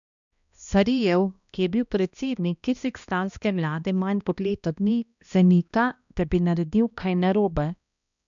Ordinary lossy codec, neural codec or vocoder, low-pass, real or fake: none; codec, 16 kHz, 1 kbps, X-Codec, HuBERT features, trained on balanced general audio; 7.2 kHz; fake